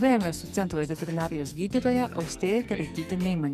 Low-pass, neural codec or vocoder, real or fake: 14.4 kHz; codec, 44.1 kHz, 2.6 kbps, SNAC; fake